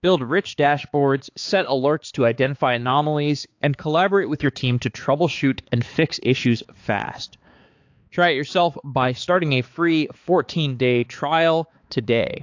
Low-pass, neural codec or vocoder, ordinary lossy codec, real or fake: 7.2 kHz; codec, 16 kHz, 4 kbps, X-Codec, HuBERT features, trained on balanced general audio; AAC, 48 kbps; fake